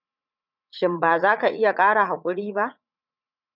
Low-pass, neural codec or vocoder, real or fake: 5.4 kHz; vocoder, 44.1 kHz, 80 mel bands, Vocos; fake